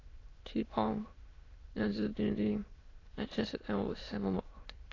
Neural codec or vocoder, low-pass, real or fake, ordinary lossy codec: autoencoder, 22.05 kHz, a latent of 192 numbers a frame, VITS, trained on many speakers; 7.2 kHz; fake; AAC, 32 kbps